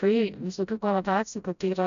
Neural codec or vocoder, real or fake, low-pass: codec, 16 kHz, 0.5 kbps, FreqCodec, smaller model; fake; 7.2 kHz